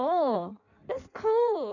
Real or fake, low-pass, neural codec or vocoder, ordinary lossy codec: fake; 7.2 kHz; codec, 16 kHz in and 24 kHz out, 1.1 kbps, FireRedTTS-2 codec; none